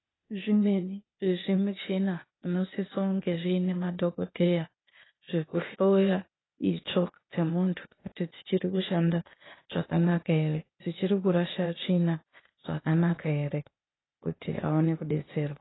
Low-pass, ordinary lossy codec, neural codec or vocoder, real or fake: 7.2 kHz; AAC, 16 kbps; codec, 16 kHz, 0.8 kbps, ZipCodec; fake